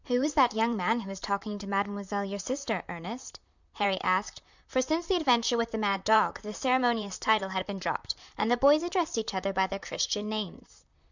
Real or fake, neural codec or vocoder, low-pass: fake; vocoder, 44.1 kHz, 128 mel bands, Pupu-Vocoder; 7.2 kHz